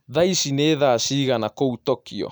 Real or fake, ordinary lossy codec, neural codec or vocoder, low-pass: real; none; none; none